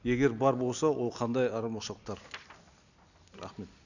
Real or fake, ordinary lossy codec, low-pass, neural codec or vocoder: real; none; 7.2 kHz; none